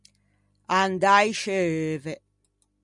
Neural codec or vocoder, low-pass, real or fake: none; 10.8 kHz; real